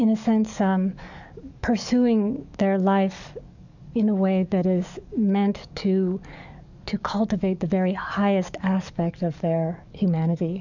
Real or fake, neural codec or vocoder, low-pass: fake; codec, 44.1 kHz, 7.8 kbps, DAC; 7.2 kHz